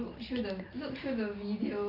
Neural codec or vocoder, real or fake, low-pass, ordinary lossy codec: none; real; 5.4 kHz; none